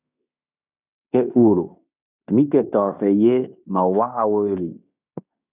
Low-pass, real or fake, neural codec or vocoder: 3.6 kHz; fake; codec, 16 kHz in and 24 kHz out, 0.9 kbps, LongCat-Audio-Codec, fine tuned four codebook decoder